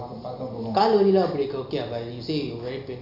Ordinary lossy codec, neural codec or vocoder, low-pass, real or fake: none; none; 5.4 kHz; real